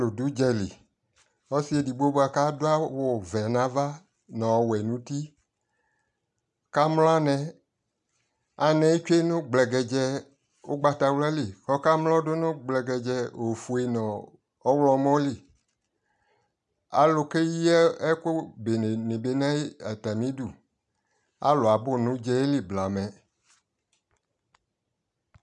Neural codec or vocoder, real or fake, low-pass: none; real; 10.8 kHz